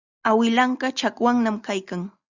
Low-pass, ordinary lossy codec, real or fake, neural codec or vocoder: 7.2 kHz; Opus, 64 kbps; real; none